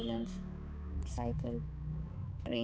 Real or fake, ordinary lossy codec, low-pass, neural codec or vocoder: fake; none; none; codec, 16 kHz, 4 kbps, X-Codec, HuBERT features, trained on balanced general audio